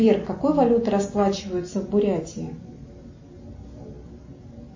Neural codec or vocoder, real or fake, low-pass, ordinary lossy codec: none; real; 7.2 kHz; MP3, 32 kbps